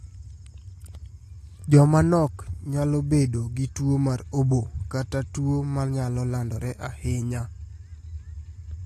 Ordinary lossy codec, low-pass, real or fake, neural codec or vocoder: AAC, 48 kbps; 14.4 kHz; real; none